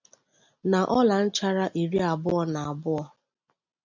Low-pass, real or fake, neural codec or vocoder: 7.2 kHz; real; none